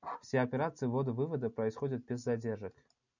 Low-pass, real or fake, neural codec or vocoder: 7.2 kHz; real; none